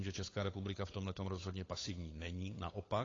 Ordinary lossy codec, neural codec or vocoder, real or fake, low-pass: AAC, 32 kbps; codec, 16 kHz, 8 kbps, FunCodec, trained on Chinese and English, 25 frames a second; fake; 7.2 kHz